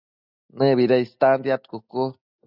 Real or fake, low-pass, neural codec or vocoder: real; 5.4 kHz; none